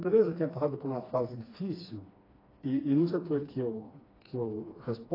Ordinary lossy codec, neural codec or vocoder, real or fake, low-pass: AAC, 24 kbps; codec, 16 kHz, 2 kbps, FreqCodec, smaller model; fake; 5.4 kHz